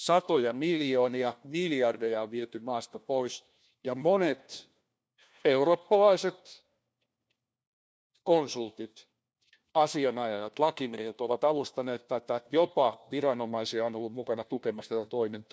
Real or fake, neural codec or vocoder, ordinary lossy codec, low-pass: fake; codec, 16 kHz, 1 kbps, FunCodec, trained on LibriTTS, 50 frames a second; none; none